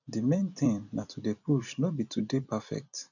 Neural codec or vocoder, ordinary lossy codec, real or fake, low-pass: vocoder, 44.1 kHz, 128 mel bands every 256 samples, BigVGAN v2; none; fake; 7.2 kHz